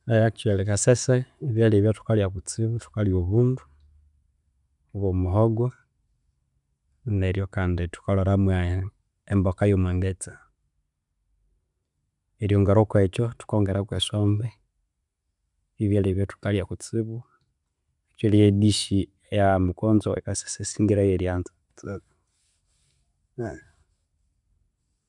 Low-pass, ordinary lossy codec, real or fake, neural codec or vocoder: 10.8 kHz; none; real; none